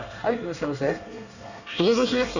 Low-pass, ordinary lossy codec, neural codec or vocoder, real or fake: 7.2 kHz; none; codec, 24 kHz, 1 kbps, SNAC; fake